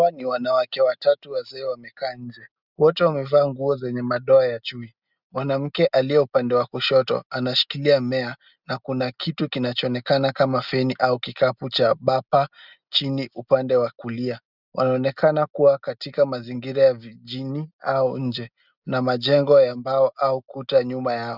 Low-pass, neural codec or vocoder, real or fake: 5.4 kHz; none; real